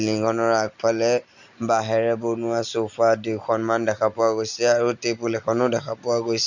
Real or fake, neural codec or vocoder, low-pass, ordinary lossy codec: real; none; 7.2 kHz; none